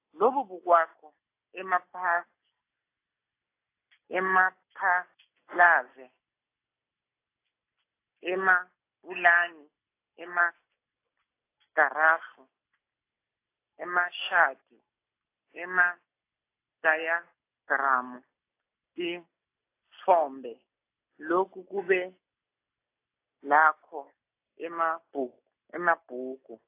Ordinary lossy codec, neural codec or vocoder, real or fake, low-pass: AAC, 24 kbps; none; real; 3.6 kHz